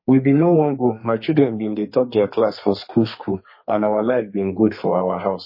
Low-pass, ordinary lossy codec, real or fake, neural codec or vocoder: 5.4 kHz; MP3, 24 kbps; fake; codec, 32 kHz, 1.9 kbps, SNAC